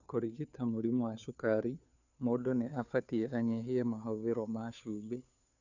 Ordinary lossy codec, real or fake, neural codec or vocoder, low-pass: none; fake; codec, 16 kHz, 2 kbps, FunCodec, trained on Chinese and English, 25 frames a second; 7.2 kHz